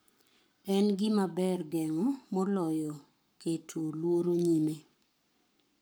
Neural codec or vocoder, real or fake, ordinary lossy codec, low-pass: codec, 44.1 kHz, 7.8 kbps, Pupu-Codec; fake; none; none